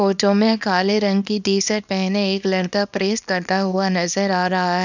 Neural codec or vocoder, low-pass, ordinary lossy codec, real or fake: codec, 24 kHz, 0.9 kbps, WavTokenizer, small release; 7.2 kHz; none; fake